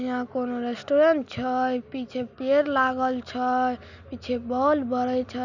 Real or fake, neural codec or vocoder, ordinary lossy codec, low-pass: real; none; none; 7.2 kHz